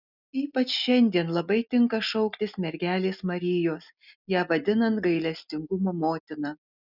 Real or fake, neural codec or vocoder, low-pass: real; none; 5.4 kHz